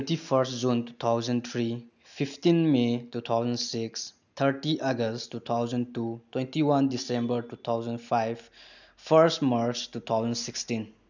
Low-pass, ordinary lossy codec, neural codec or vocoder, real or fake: 7.2 kHz; none; none; real